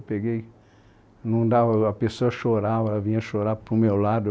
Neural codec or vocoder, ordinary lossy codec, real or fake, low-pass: none; none; real; none